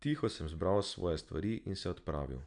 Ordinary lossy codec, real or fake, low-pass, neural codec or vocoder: none; real; 9.9 kHz; none